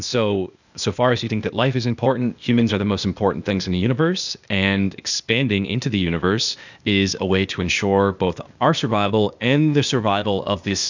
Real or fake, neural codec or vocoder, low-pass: fake; codec, 16 kHz, 0.8 kbps, ZipCodec; 7.2 kHz